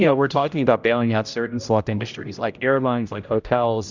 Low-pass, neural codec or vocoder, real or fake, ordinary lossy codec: 7.2 kHz; codec, 16 kHz, 0.5 kbps, X-Codec, HuBERT features, trained on general audio; fake; Opus, 64 kbps